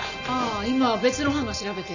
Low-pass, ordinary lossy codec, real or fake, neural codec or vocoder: 7.2 kHz; none; real; none